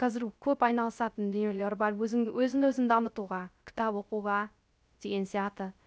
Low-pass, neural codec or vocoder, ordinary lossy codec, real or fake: none; codec, 16 kHz, 0.3 kbps, FocalCodec; none; fake